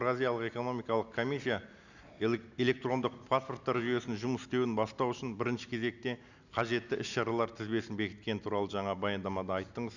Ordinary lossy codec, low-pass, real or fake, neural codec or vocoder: none; 7.2 kHz; real; none